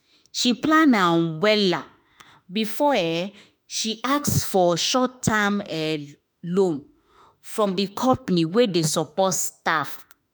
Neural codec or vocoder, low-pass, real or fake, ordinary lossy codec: autoencoder, 48 kHz, 32 numbers a frame, DAC-VAE, trained on Japanese speech; none; fake; none